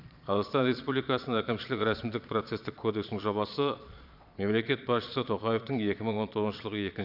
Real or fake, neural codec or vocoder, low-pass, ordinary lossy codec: fake; vocoder, 22.05 kHz, 80 mel bands, Vocos; 5.4 kHz; none